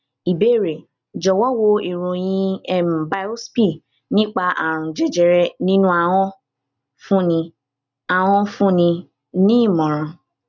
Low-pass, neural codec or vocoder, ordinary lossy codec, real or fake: 7.2 kHz; none; none; real